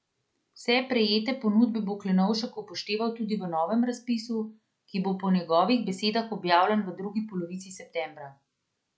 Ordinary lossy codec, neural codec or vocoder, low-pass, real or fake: none; none; none; real